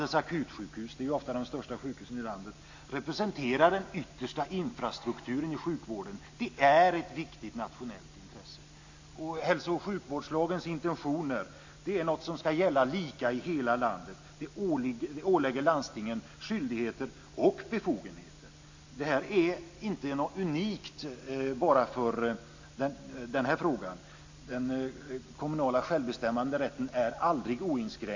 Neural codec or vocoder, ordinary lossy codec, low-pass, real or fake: none; AAC, 48 kbps; 7.2 kHz; real